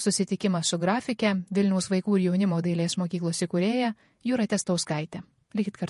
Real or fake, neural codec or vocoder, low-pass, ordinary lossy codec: fake; vocoder, 48 kHz, 128 mel bands, Vocos; 14.4 kHz; MP3, 48 kbps